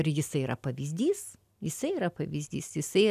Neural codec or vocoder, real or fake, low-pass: none; real; 14.4 kHz